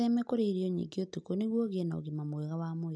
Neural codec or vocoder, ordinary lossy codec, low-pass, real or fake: none; none; none; real